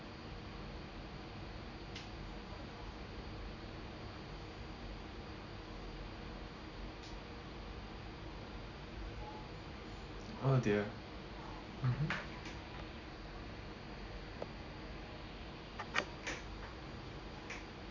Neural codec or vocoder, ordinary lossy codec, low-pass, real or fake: none; none; 7.2 kHz; real